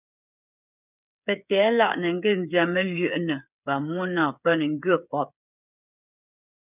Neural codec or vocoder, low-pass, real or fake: codec, 16 kHz, 8 kbps, FreqCodec, smaller model; 3.6 kHz; fake